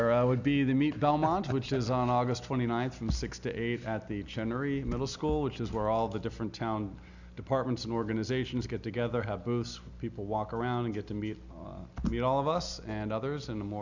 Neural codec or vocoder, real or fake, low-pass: none; real; 7.2 kHz